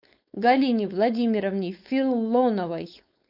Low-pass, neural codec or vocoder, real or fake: 5.4 kHz; codec, 16 kHz, 4.8 kbps, FACodec; fake